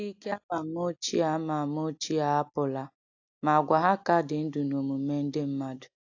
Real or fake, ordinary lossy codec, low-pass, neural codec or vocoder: real; AAC, 48 kbps; 7.2 kHz; none